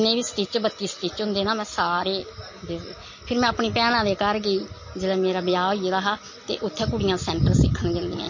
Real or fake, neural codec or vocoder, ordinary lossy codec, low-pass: real; none; MP3, 32 kbps; 7.2 kHz